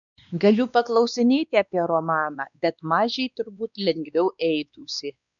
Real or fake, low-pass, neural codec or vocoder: fake; 7.2 kHz; codec, 16 kHz, 2 kbps, X-Codec, WavLM features, trained on Multilingual LibriSpeech